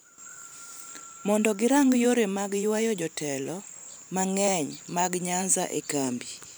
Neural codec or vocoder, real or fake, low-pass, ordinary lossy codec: vocoder, 44.1 kHz, 128 mel bands every 512 samples, BigVGAN v2; fake; none; none